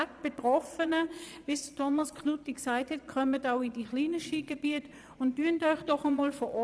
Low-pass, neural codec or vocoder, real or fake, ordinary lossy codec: none; vocoder, 22.05 kHz, 80 mel bands, Vocos; fake; none